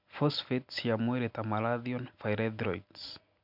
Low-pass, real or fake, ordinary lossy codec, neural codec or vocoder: 5.4 kHz; real; none; none